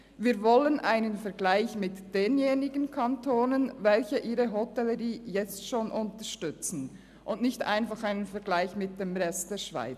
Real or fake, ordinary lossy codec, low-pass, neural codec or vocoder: real; none; 14.4 kHz; none